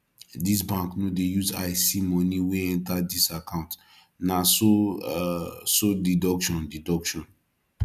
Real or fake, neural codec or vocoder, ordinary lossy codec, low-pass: real; none; none; 14.4 kHz